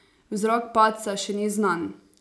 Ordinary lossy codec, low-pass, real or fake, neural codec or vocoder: none; none; real; none